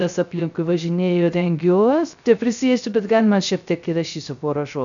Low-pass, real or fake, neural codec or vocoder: 7.2 kHz; fake; codec, 16 kHz, 0.3 kbps, FocalCodec